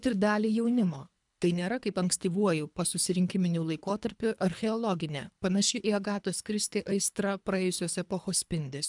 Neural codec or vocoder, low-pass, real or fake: codec, 24 kHz, 3 kbps, HILCodec; 10.8 kHz; fake